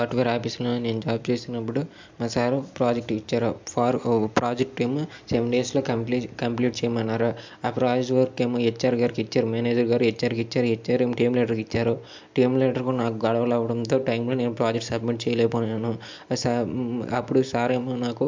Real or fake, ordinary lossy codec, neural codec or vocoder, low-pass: real; MP3, 64 kbps; none; 7.2 kHz